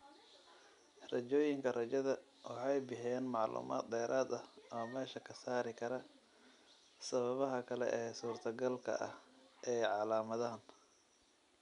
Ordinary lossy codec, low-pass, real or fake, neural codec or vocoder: none; 10.8 kHz; real; none